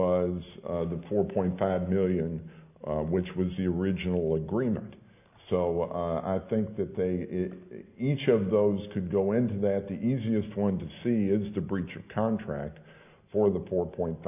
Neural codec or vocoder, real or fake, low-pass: none; real; 3.6 kHz